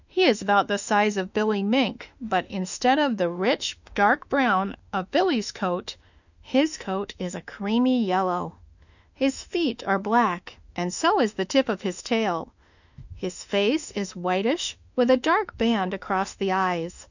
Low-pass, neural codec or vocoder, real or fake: 7.2 kHz; autoencoder, 48 kHz, 32 numbers a frame, DAC-VAE, trained on Japanese speech; fake